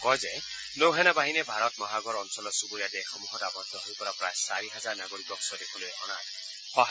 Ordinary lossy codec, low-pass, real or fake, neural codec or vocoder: none; none; real; none